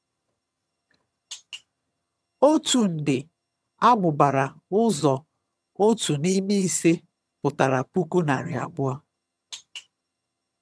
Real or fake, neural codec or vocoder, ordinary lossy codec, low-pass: fake; vocoder, 22.05 kHz, 80 mel bands, HiFi-GAN; none; none